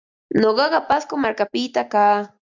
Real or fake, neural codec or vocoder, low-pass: real; none; 7.2 kHz